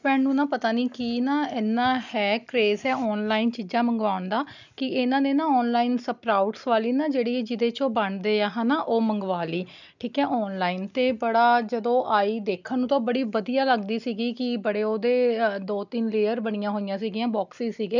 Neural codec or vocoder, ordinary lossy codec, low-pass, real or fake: none; none; 7.2 kHz; real